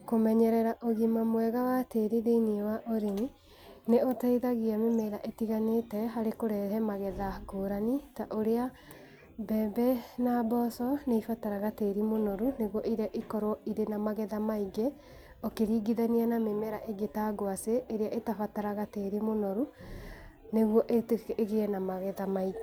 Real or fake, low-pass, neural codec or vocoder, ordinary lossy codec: real; none; none; none